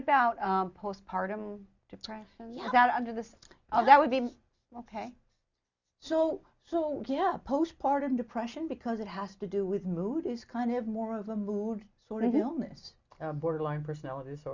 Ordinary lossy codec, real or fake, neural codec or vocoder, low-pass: Opus, 64 kbps; real; none; 7.2 kHz